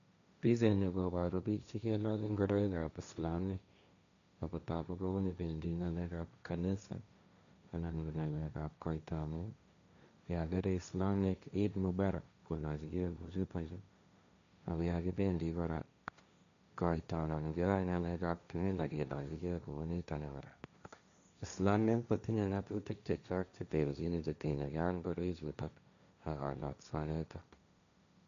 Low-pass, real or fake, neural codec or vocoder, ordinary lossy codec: 7.2 kHz; fake; codec, 16 kHz, 1.1 kbps, Voila-Tokenizer; none